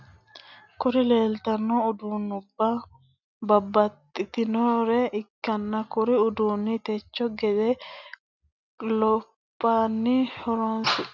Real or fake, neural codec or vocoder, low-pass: real; none; 7.2 kHz